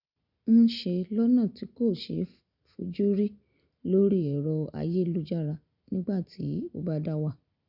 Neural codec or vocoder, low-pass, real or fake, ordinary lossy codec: none; 5.4 kHz; real; none